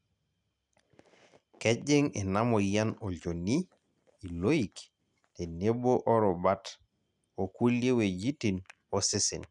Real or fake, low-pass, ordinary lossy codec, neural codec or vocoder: real; 10.8 kHz; none; none